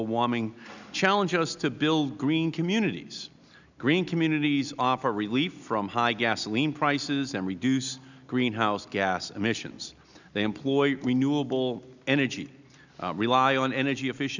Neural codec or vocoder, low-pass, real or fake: none; 7.2 kHz; real